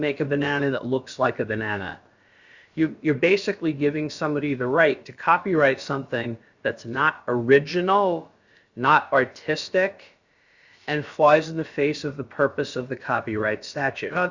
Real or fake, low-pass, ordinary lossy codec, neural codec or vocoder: fake; 7.2 kHz; Opus, 64 kbps; codec, 16 kHz, about 1 kbps, DyCAST, with the encoder's durations